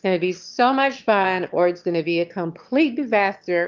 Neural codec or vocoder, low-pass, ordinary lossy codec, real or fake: autoencoder, 22.05 kHz, a latent of 192 numbers a frame, VITS, trained on one speaker; 7.2 kHz; Opus, 24 kbps; fake